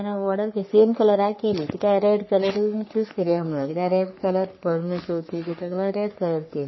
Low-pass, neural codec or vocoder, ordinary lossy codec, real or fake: 7.2 kHz; codec, 44.1 kHz, 3.4 kbps, Pupu-Codec; MP3, 24 kbps; fake